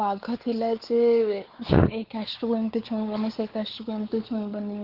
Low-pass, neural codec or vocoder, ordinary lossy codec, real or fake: 5.4 kHz; codec, 16 kHz, 4 kbps, X-Codec, WavLM features, trained on Multilingual LibriSpeech; Opus, 16 kbps; fake